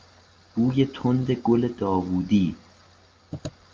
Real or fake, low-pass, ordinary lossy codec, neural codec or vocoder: real; 7.2 kHz; Opus, 24 kbps; none